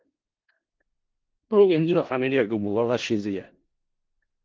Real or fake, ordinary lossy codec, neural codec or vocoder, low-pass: fake; Opus, 16 kbps; codec, 16 kHz in and 24 kHz out, 0.4 kbps, LongCat-Audio-Codec, four codebook decoder; 7.2 kHz